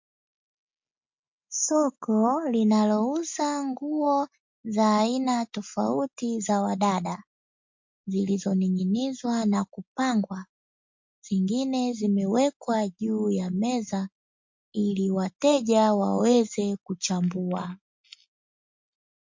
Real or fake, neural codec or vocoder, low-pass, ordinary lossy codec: real; none; 7.2 kHz; MP3, 48 kbps